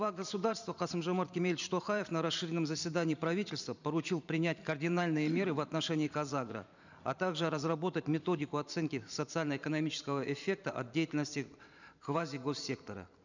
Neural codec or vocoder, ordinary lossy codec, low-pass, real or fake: vocoder, 44.1 kHz, 128 mel bands every 512 samples, BigVGAN v2; none; 7.2 kHz; fake